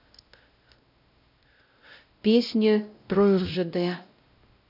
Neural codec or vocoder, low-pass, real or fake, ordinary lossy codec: codec, 16 kHz, 0.5 kbps, X-Codec, WavLM features, trained on Multilingual LibriSpeech; 5.4 kHz; fake; none